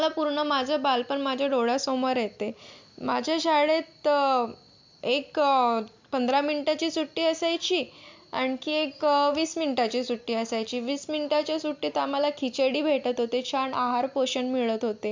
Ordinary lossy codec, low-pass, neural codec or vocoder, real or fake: MP3, 64 kbps; 7.2 kHz; none; real